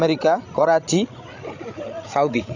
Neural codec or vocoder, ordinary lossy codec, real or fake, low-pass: codec, 16 kHz, 16 kbps, FreqCodec, larger model; AAC, 48 kbps; fake; 7.2 kHz